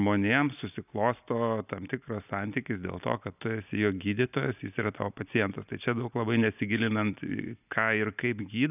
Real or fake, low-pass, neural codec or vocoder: real; 3.6 kHz; none